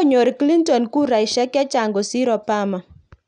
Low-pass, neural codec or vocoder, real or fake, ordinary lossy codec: 9.9 kHz; none; real; none